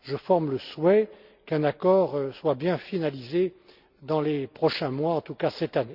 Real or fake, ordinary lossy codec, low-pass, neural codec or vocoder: real; Opus, 64 kbps; 5.4 kHz; none